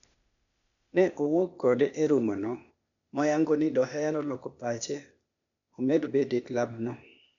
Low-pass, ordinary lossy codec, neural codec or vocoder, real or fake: 7.2 kHz; none; codec, 16 kHz, 0.8 kbps, ZipCodec; fake